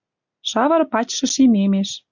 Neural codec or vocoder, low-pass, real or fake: none; 7.2 kHz; real